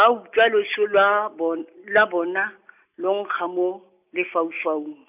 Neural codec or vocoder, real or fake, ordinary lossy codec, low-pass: none; real; none; 3.6 kHz